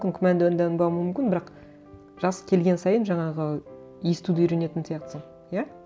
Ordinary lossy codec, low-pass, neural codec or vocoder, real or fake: none; none; none; real